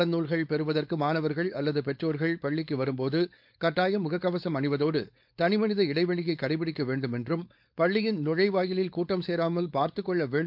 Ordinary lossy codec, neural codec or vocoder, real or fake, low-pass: MP3, 48 kbps; codec, 16 kHz, 4.8 kbps, FACodec; fake; 5.4 kHz